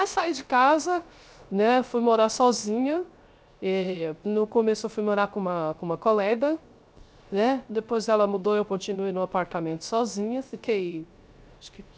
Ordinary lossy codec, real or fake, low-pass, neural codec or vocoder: none; fake; none; codec, 16 kHz, 0.3 kbps, FocalCodec